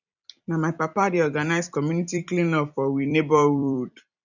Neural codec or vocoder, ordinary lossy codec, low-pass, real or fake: vocoder, 44.1 kHz, 128 mel bands every 256 samples, BigVGAN v2; none; 7.2 kHz; fake